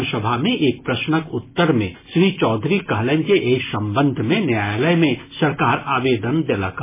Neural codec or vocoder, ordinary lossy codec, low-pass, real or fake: none; MP3, 16 kbps; 3.6 kHz; real